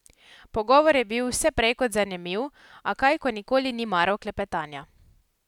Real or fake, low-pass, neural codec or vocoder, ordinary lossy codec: real; 19.8 kHz; none; none